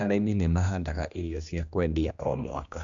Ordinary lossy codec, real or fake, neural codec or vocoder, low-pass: Opus, 64 kbps; fake; codec, 16 kHz, 1 kbps, X-Codec, HuBERT features, trained on general audio; 7.2 kHz